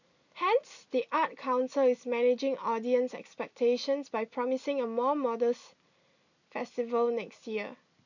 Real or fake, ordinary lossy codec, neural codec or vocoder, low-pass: real; none; none; 7.2 kHz